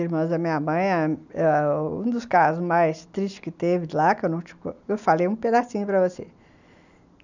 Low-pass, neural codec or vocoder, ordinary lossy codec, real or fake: 7.2 kHz; none; none; real